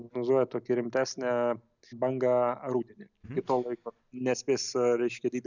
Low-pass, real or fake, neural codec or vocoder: 7.2 kHz; real; none